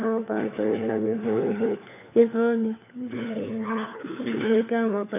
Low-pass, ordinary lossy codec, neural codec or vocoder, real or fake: 3.6 kHz; none; codec, 16 kHz, 4 kbps, FunCodec, trained on LibriTTS, 50 frames a second; fake